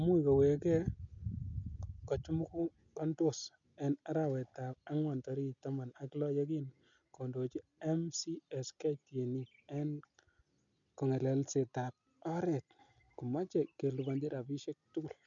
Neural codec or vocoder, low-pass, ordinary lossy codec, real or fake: none; 7.2 kHz; none; real